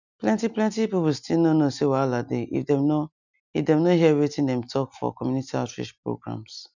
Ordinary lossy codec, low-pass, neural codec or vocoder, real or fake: none; 7.2 kHz; none; real